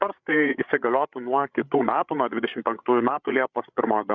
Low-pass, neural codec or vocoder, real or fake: 7.2 kHz; codec, 16 kHz, 8 kbps, FreqCodec, larger model; fake